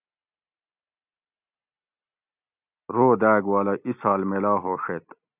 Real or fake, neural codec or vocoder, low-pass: real; none; 3.6 kHz